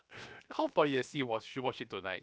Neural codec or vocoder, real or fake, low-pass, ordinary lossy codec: codec, 16 kHz, 0.7 kbps, FocalCodec; fake; none; none